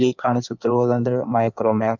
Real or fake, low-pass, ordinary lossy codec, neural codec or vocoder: fake; 7.2 kHz; none; codec, 16 kHz in and 24 kHz out, 1.1 kbps, FireRedTTS-2 codec